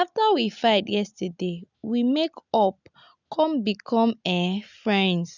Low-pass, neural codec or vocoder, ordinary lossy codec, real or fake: 7.2 kHz; none; none; real